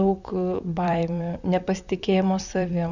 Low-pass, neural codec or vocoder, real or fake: 7.2 kHz; vocoder, 44.1 kHz, 128 mel bands, Pupu-Vocoder; fake